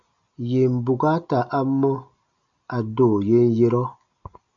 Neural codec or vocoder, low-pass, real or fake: none; 7.2 kHz; real